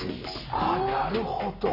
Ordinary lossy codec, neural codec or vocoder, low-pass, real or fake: none; none; 5.4 kHz; real